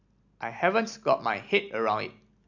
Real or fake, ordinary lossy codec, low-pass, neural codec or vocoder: real; MP3, 64 kbps; 7.2 kHz; none